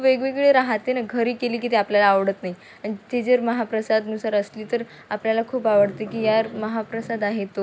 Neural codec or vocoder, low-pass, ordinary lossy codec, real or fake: none; none; none; real